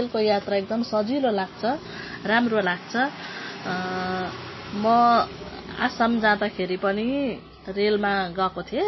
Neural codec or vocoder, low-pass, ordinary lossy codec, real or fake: none; 7.2 kHz; MP3, 24 kbps; real